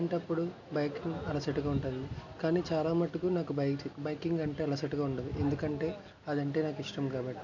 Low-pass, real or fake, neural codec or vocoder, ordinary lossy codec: 7.2 kHz; real; none; none